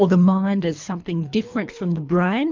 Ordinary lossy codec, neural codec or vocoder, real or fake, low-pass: MP3, 64 kbps; codec, 24 kHz, 3 kbps, HILCodec; fake; 7.2 kHz